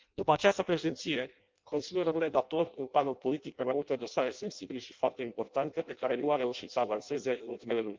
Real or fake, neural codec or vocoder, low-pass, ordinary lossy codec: fake; codec, 16 kHz in and 24 kHz out, 0.6 kbps, FireRedTTS-2 codec; 7.2 kHz; Opus, 24 kbps